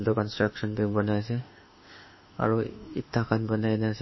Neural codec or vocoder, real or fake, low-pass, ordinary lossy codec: autoencoder, 48 kHz, 32 numbers a frame, DAC-VAE, trained on Japanese speech; fake; 7.2 kHz; MP3, 24 kbps